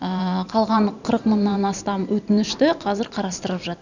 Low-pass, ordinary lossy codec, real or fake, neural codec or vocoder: 7.2 kHz; none; fake; vocoder, 44.1 kHz, 128 mel bands every 512 samples, BigVGAN v2